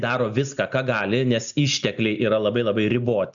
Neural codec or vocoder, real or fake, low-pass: none; real; 7.2 kHz